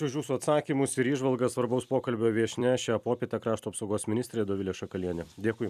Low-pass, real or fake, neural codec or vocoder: 14.4 kHz; fake; vocoder, 44.1 kHz, 128 mel bands every 256 samples, BigVGAN v2